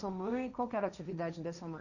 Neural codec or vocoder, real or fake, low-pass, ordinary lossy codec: codec, 16 kHz, 1.1 kbps, Voila-Tokenizer; fake; 7.2 kHz; none